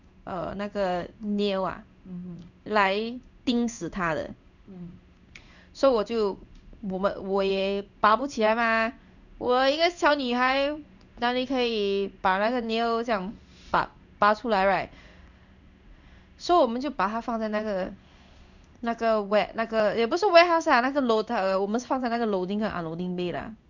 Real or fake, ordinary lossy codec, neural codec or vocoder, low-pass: fake; none; codec, 16 kHz in and 24 kHz out, 1 kbps, XY-Tokenizer; 7.2 kHz